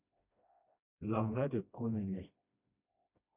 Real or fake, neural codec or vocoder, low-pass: fake; codec, 16 kHz, 1 kbps, FreqCodec, smaller model; 3.6 kHz